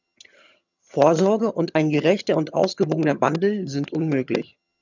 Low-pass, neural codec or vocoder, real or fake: 7.2 kHz; vocoder, 22.05 kHz, 80 mel bands, HiFi-GAN; fake